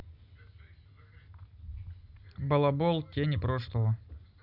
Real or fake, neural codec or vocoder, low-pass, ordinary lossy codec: real; none; 5.4 kHz; none